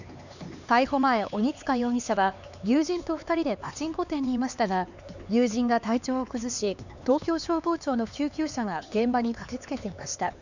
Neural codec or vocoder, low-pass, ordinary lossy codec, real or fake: codec, 16 kHz, 4 kbps, X-Codec, HuBERT features, trained on LibriSpeech; 7.2 kHz; none; fake